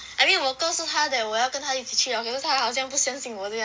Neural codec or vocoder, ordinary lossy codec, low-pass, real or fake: none; none; none; real